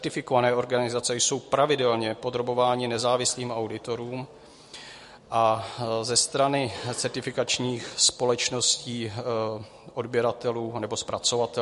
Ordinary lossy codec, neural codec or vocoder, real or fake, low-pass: MP3, 48 kbps; none; real; 14.4 kHz